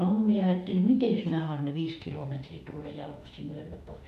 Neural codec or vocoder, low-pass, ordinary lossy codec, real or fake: autoencoder, 48 kHz, 32 numbers a frame, DAC-VAE, trained on Japanese speech; 14.4 kHz; none; fake